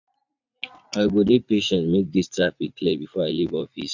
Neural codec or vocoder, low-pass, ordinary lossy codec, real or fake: vocoder, 44.1 kHz, 80 mel bands, Vocos; 7.2 kHz; none; fake